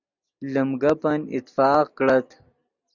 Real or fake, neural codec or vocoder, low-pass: real; none; 7.2 kHz